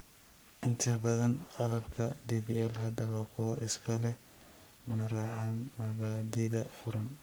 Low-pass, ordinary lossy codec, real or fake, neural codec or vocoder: none; none; fake; codec, 44.1 kHz, 3.4 kbps, Pupu-Codec